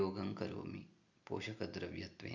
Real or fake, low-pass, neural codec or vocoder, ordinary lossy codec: real; 7.2 kHz; none; none